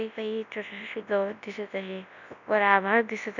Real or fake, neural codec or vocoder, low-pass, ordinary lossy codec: fake; codec, 24 kHz, 0.9 kbps, WavTokenizer, large speech release; 7.2 kHz; none